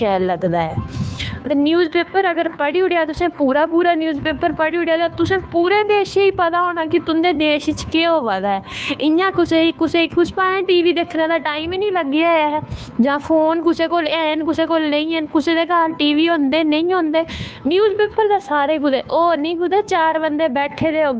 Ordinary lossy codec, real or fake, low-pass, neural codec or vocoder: none; fake; none; codec, 16 kHz, 2 kbps, FunCodec, trained on Chinese and English, 25 frames a second